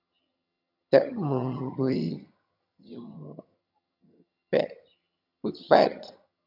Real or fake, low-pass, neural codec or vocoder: fake; 5.4 kHz; vocoder, 22.05 kHz, 80 mel bands, HiFi-GAN